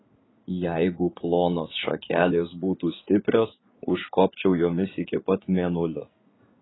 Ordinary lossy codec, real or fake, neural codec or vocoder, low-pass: AAC, 16 kbps; fake; vocoder, 44.1 kHz, 80 mel bands, Vocos; 7.2 kHz